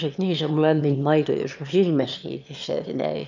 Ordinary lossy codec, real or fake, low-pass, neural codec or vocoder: none; fake; 7.2 kHz; autoencoder, 22.05 kHz, a latent of 192 numbers a frame, VITS, trained on one speaker